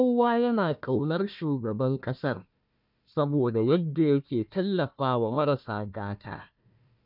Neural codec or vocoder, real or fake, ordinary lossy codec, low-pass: codec, 16 kHz, 1 kbps, FunCodec, trained on Chinese and English, 50 frames a second; fake; none; 5.4 kHz